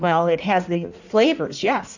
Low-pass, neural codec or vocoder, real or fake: 7.2 kHz; codec, 16 kHz in and 24 kHz out, 1.1 kbps, FireRedTTS-2 codec; fake